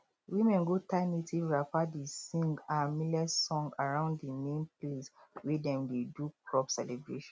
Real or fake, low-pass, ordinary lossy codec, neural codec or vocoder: real; none; none; none